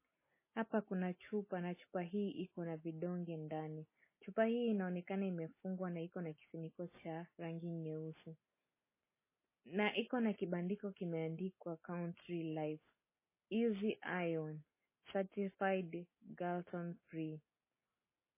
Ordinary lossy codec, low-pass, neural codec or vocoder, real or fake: MP3, 16 kbps; 3.6 kHz; none; real